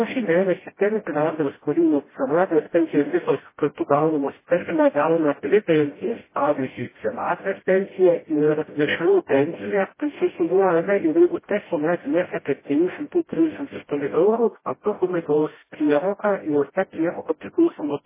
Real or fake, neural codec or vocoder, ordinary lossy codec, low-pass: fake; codec, 16 kHz, 0.5 kbps, FreqCodec, smaller model; MP3, 16 kbps; 3.6 kHz